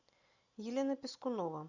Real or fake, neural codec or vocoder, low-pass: real; none; 7.2 kHz